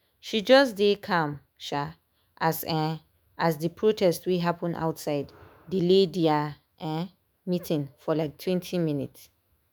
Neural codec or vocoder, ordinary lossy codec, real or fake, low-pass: autoencoder, 48 kHz, 128 numbers a frame, DAC-VAE, trained on Japanese speech; none; fake; none